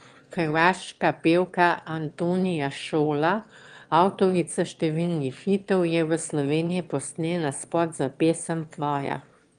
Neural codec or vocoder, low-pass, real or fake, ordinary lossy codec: autoencoder, 22.05 kHz, a latent of 192 numbers a frame, VITS, trained on one speaker; 9.9 kHz; fake; Opus, 32 kbps